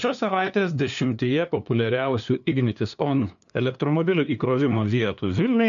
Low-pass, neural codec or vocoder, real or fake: 7.2 kHz; codec, 16 kHz, 2 kbps, FunCodec, trained on LibriTTS, 25 frames a second; fake